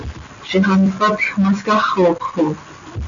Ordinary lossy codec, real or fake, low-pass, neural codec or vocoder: MP3, 64 kbps; real; 7.2 kHz; none